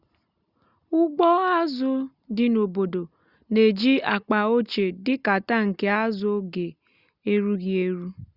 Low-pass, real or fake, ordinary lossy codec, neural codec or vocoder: 5.4 kHz; real; Opus, 64 kbps; none